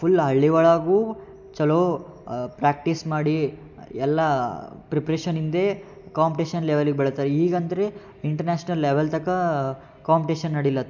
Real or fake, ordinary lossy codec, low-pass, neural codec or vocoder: real; none; 7.2 kHz; none